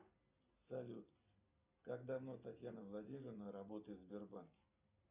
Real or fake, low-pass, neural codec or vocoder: real; 3.6 kHz; none